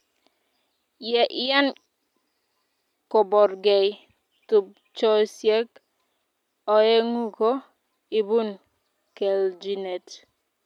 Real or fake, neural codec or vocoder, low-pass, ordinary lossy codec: real; none; 19.8 kHz; none